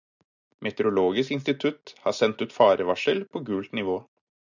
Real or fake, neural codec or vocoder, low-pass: real; none; 7.2 kHz